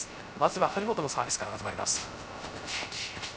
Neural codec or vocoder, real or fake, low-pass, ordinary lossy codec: codec, 16 kHz, 0.3 kbps, FocalCodec; fake; none; none